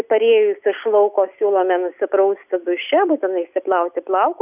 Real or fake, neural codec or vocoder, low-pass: real; none; 3.6 kHz